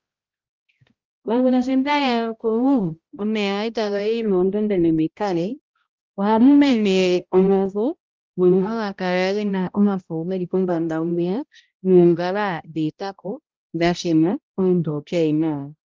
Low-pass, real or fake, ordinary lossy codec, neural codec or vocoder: 7.2 kHz; fake; Opus, 24 kbps; codec, 16 kHz, 0.5 kbps, X-Codec, HuBERT features, trained on balanced general audio